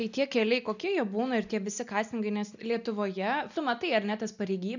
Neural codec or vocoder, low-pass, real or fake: none; 7.2 kHz; real